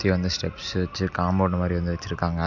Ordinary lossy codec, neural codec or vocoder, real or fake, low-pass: MP3, 64 kbps; none; real; 7.2 kHz